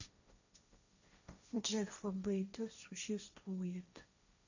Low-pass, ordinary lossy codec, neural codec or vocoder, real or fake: 7.2 kHz; none; codec, 16 kHz, 1.1 kbps, Voila-Tokenizer; fake